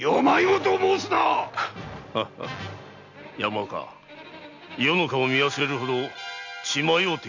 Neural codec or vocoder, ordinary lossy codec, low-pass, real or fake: none; none; 7.2 kHz; real